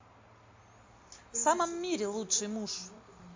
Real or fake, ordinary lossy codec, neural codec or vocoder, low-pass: real; MP3, 48 kbps; none; 7.2 kHz